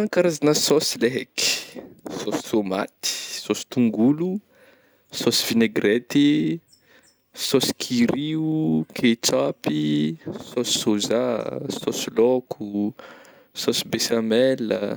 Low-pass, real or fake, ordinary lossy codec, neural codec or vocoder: none; real; none; none